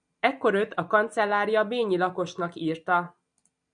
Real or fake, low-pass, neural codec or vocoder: real; 9.9 kHz; none